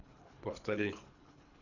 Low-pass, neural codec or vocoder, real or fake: 7.2 kHz; codec, 24 kHz, 3 kbps, HILCodec; fake